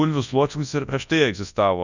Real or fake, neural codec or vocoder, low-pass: fake; codec, 24 kHz, 0.9 kbps, WavTokenizer, large speech release; 7.2 kHz